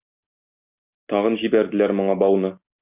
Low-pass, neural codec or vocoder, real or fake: 3.6 kHz; none; real